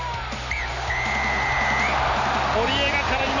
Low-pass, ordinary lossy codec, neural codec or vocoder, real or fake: 7.2 kHz; none; none; real